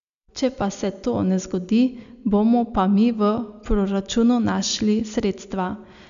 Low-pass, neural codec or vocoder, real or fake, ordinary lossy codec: 7.2 kHz; none; real; none